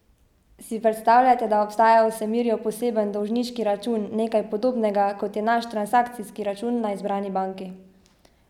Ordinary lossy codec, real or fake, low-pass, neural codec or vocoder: none; real; 19.8 kHz; none